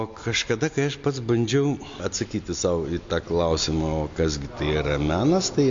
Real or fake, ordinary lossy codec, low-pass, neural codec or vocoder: real; MP3, 48 kbps; 7.2 kHz; none